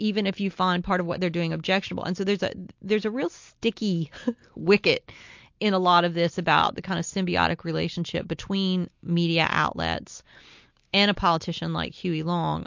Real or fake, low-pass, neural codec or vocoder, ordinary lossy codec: real; 7.2 kHz; none; MP3, 48 kbps